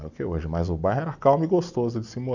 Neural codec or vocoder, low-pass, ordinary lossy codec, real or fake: none; 7.2 kHz; none; real